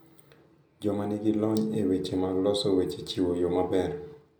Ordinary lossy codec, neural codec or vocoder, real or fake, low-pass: none; none; real; none